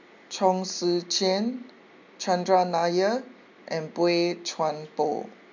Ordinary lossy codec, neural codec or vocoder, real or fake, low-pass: MP3, 64 kbps; none; real; 7.2 kHz